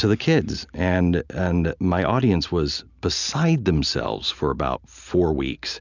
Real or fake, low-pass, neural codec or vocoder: real; 7.2 kHz; none